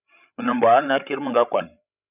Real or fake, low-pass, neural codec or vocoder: fake; 3.6 kHz; codec, 16 kHz, 16 kbps, FreqCodec, larger model